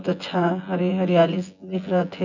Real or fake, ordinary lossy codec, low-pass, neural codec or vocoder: fake; none; 7.2 kHz; vocoder, 24 kHz, 100 mel bands, Vocos